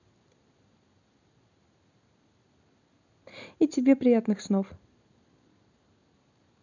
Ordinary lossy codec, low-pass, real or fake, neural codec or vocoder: none; 7.2 kHz; real; none